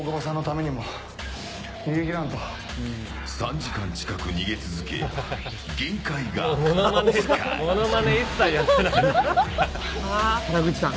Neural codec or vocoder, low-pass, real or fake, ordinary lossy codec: none; none; real; none